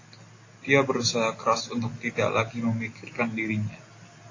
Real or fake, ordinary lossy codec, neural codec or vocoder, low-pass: real; AAC, 32 kbps; none; 7.2 kHz